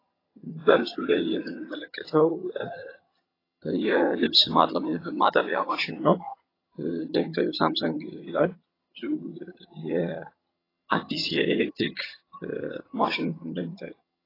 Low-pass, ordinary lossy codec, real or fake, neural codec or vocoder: 5.4 kHz; AAC, 24 kbps; fake; vocoder, 22.05 kHz, 80 mel bands, HiFi-GAN